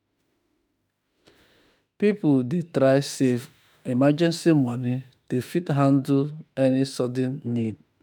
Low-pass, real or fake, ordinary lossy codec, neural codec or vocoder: 19.8 kHz; fake; none; autoencoder, 48 kHz, 32 numbers a frame, DAC-VAE, trained on Japanese speech